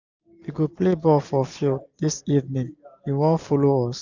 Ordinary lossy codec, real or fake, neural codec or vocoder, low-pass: none; real; none; 7.2 kHz